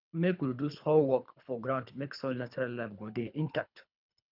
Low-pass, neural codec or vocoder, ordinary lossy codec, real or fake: 5.4 kHz; codec, 24 kHz, 3 kbps, HILCodec; none; fake